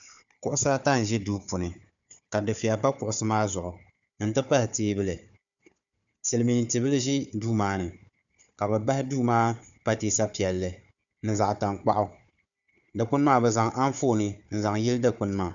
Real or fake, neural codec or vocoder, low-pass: fake; codec, 16 kHz, 4 kbps, FunCodec, trained on Chinese and English, 50 frames a second; 7.2 kHz